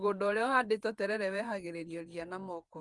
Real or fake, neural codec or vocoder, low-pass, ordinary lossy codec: fake; vocoder, 48 kHz, 128 mel bands, Vocos; 10.8 kHz; Opus, 24 kbps